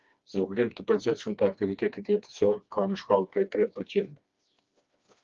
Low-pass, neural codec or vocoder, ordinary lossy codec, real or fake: 7.2 kHz; codec, 16 kHz, 2 kbps, FreqCodec, smaller model; Opus, 32 kbps; fake